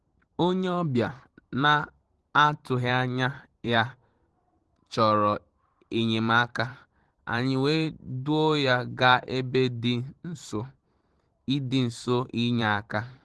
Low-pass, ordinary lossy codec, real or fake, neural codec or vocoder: 10.8 kHz; Opus, 16 kbps; fake; vocoder, 44.1 kHz, 128 mel bands every 512 samples, BigVGAN v2